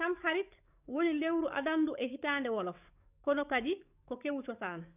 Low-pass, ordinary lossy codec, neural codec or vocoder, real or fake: 3.6 kHz; none; codec, 44.1 kHz, 7.8 kbps, DAC; fake